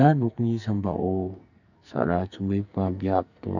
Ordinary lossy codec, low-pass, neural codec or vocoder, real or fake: none; 7.2 kHz; codec, 44.1 kHz, 2.6 kbps, SNAC; fake